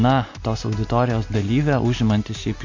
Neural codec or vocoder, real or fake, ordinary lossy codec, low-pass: none; real; MP3, 48 kbps; 7.2 kHz